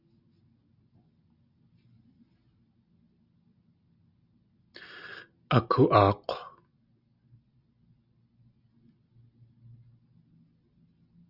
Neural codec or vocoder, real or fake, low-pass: none; real; 5.4 kHz